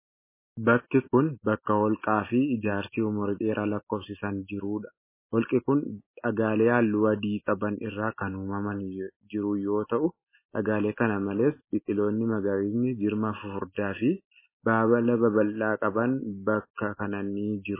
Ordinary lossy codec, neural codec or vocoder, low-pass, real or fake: MP3, 16 kbps; none; 3.6 kHz; real